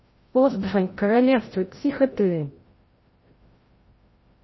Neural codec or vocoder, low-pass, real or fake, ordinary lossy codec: codec, 16 kHz, 0.5 kbps, FreqCodec, larger model; 7.2 kHz; fake; MP3, 24 kbps